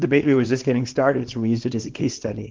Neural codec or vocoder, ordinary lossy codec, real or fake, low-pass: codec, 24 kHz, 0.9 kbps, WavTokenizer, small release; Opus, 16 kbps; fake; 7.2 kHz